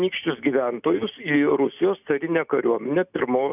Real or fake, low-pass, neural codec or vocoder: real; 3.6 kHz; none